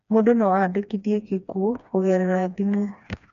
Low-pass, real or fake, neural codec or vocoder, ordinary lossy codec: 7.2 kHz; fake; codec, 16 kHz, 2 kbps, FreqCodec, smaller model; none